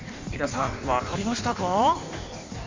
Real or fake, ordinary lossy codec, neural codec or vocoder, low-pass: fake; MP3, 64 kbps; codec, 16 kHz in and 24 kHz out, 1.1 kbps, FireRedTTS-2 codec; 7.2 kHz